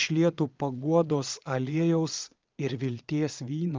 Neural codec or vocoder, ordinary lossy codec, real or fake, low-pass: vocoder, 22.05 kHz, 80 mel bands, Vocos; Opus, 32 kbps; fake; 7.2 kHz